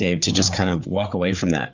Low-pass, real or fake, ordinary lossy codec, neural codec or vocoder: 7.2 kHz; fake; Opus, 64 kbps; codec, 16 kHz, 4 kbps, FreqCodec, larger model